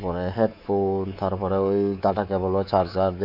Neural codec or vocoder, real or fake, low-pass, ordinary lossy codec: codec, 24 kHz, 3.1 kbps, DualCodec; fake; 5.4 kHz; none